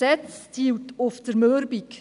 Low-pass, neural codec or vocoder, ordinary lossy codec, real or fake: 10.8 kHz; none; none; real